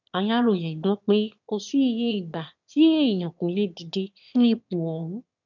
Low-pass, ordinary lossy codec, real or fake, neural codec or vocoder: 7.2 kHz; none; fake; autoencoder, 22.05 kHz, a latent of 192 numbers a frame, VITS, trained on one speaker